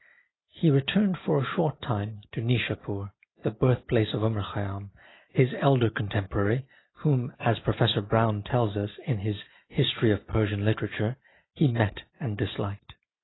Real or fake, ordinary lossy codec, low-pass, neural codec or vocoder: real; AAC, 16 kbps; 7.2 kHz; none